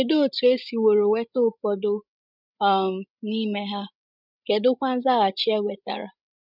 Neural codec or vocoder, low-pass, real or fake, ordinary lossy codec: codec, 16 kHz, 16 kbps, FreqCodec, larger model; 5.4 kHz; fake; none